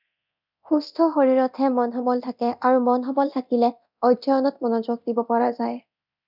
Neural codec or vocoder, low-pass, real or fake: codec, 24 kHz, 0.9 kbps, DualCodec; 5.4 kHz; fake